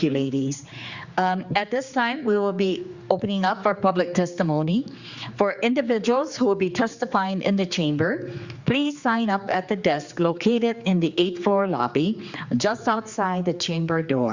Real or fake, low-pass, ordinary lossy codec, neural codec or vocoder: fake; 7.2 kHz; Opus, 64 kbps; codec, 16 kHz, 4 kbps, X-Codec, HuBERT features, trained on general audio